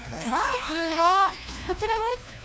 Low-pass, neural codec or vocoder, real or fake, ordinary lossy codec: none; codec, 16 kHz, 1 kbps, FunCodec, trained on LibriTTS, 50 frames a second; fake; none